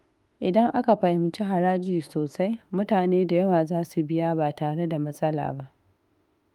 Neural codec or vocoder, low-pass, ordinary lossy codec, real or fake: autoencoder, 48 kHz, 32 numbers a frame, DAC-VAE, trained on Japanese speech; 19.8 kHz; Opus, 32 kbps; fake